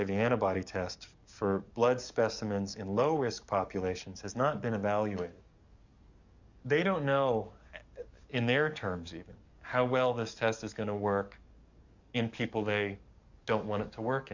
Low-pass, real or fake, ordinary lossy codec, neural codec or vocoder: 7.2 kHz; fake; Opus, 64 kbps; codec, 44.1 kHz, 7.8 kbps, DAC